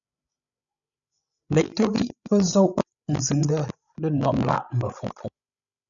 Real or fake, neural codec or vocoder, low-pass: fake; codec, 16 kHz, 16 kbps, FreqCodec, larger model; 7.2 kHz